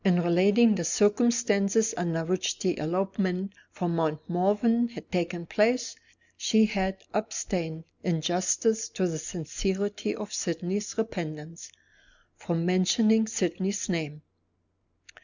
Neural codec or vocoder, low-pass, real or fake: none; 7.2 kHz; real